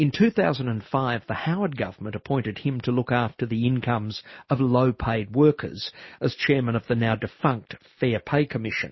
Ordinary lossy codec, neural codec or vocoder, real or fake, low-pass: MP3, 24 kbps; none; real; 7.2 kHz